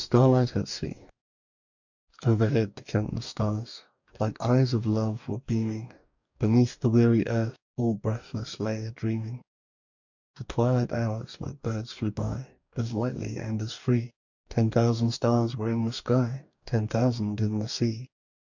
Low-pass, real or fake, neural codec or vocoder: 7.2 kHz; fake; codec, 44.1 kHz, 2.6 kbps, DAC